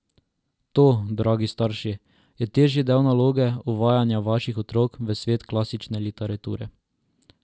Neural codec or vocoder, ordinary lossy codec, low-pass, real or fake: none; none; none; real